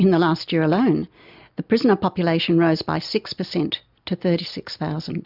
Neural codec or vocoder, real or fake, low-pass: none; real; 5.4 kHz